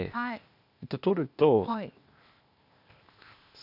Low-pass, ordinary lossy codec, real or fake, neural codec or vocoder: 5.4 kHz; none; fake; codec, 16 kHz, 0.8 kbps, ZipCodec